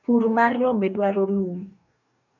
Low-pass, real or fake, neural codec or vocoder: 7.2 kHz; fake; codec, 44.1 kHz, 2.6 kbps, DAC